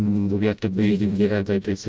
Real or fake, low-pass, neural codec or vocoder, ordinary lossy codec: fake; none; codec, 16 kHz, 0.5 kbps, FreqCodec, smaller model; none